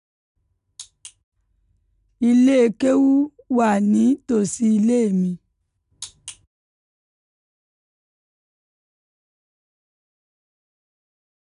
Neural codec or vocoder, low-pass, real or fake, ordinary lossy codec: none; 10.8 kHz; real; none